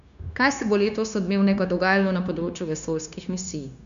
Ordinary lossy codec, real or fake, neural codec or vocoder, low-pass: none; fake; codec, 16 kHz, 0.9 kbps, LongCat-Audio-Codec; 7.2 kHz